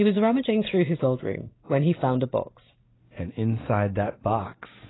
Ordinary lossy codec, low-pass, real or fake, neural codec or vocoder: AAC, 16 kbps; 7.2 kHz; real; none